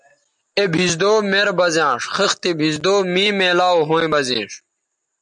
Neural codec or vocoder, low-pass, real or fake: none; 10.8 kHz; real